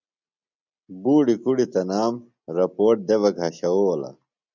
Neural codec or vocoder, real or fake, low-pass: none; real; 7.2 kHz